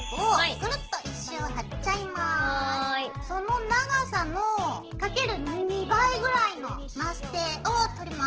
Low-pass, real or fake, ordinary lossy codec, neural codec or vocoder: 7.2 kHz; real; Opus, 16 kbps; none